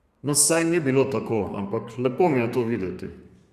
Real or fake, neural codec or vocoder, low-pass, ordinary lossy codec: fake; codec, 32 kHz, 1.9 kbps, SNAC; 14.4 kHz; Opus, 64 kbps